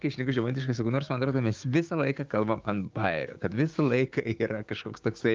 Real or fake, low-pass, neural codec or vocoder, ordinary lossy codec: fake; 7.2 kHz; codec, 16 kHz, 6 kbps, DAC; Opus, 24 kbps